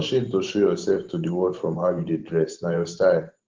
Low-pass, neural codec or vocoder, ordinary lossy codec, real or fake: 7.2 kHz; vocoder, 44.1 kHz, 128 mel bands every 512 samples, BigVGAN v2; Opus, 16 kbps; fake